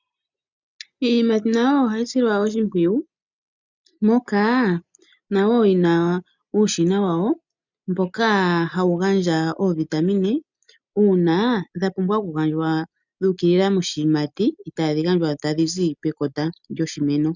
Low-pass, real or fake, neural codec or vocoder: 7.2 kHz; real; none